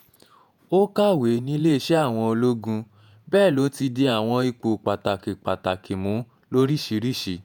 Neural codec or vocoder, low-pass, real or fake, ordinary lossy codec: vocoder, 48 kHz, 128 mel bands, Vocos; none; fake; none